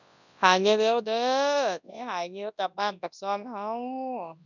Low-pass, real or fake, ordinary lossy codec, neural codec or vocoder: 7.2 kHz; fake; none; codec, 24 kHz, 0.9 kbps, WavTokenizer, large speech release